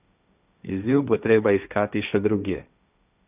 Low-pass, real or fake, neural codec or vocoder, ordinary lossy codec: 3.6 kHz; fake; codec, 16 kHz, 1.1 kbps, Voila-Tokenizer; none